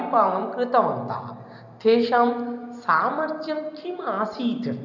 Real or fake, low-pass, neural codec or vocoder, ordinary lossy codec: real; 7.2 kHz; none; none